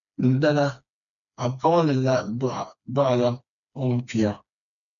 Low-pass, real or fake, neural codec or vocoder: 7.2 kHz; fake; codec, 16 kHz, 2 kbps, FreqCodec, smaller model